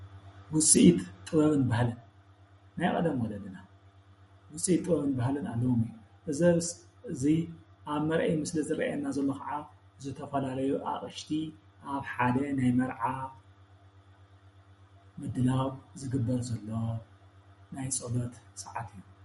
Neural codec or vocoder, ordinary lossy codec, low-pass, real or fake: none; MP3, 48 kbps; 19.8 kHz; real